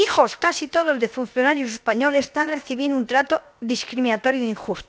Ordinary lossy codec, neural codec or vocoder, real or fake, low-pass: none; codec, 16 kHz, 0.7 kbps, FocalCodec; fake; none